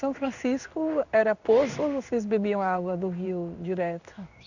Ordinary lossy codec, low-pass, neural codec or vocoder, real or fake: none; 7.2 kHz; codec, 16 kHz in and 24 kHz out, 1 kbps, XY-Tokenizer; fake